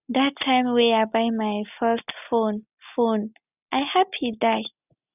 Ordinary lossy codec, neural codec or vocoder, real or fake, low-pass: none; none; real; 3.6 kHz